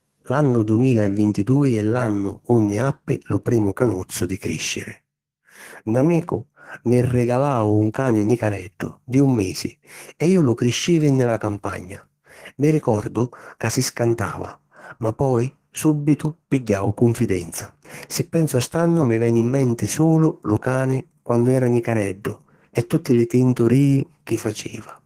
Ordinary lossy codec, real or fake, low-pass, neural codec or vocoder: Opus, 16 kbps; fake; 14.4 kHz; codec, 32 kHz, 1.9 kbps, SNAC